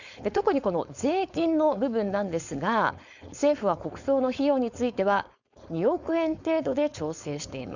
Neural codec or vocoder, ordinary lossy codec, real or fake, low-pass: codec, 16 kHz, 4.8 kbps, FACodec; none; fake; 7.2 kHz